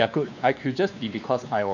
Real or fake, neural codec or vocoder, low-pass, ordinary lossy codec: fake; codec, 16 kHz, 2 kbps, X-Codec, WavLM features, trained on Multilingual LibriSpeech; 7.2 kHz; none